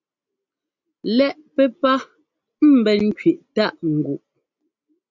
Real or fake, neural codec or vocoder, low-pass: real; none; 7.2 kHz